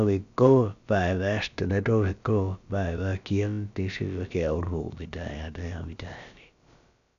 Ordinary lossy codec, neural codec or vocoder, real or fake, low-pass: none; codec, 16 kHz, about 1 kbps, DyCAST, with the encoder's durations; fake; 7.2 kHz